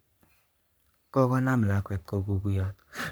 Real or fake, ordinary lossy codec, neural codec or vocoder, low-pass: fake; none; codec, 44.1 kHz, 3.4 kbps, Pupu-Codec; none